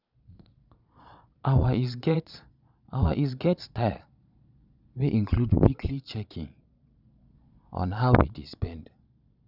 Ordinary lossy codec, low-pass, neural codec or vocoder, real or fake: none; 5.4 kHz; vocoder, 22.05 kHz, 80 mel bands, Vocos; fake